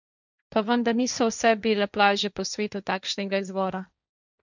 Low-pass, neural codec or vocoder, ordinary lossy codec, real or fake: 7.2 kHz; codec, 16 kHz, 1.1 kbps, Voila-Tokenizer; none; fake